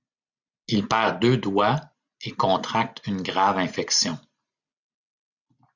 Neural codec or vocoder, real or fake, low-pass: none; real; 7.2 kHz